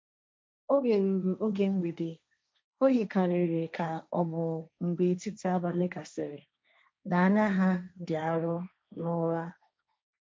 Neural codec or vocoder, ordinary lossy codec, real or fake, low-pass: codec, 16 kHz, 1.1 kbps, Voila-Tokenizer; none; fake; none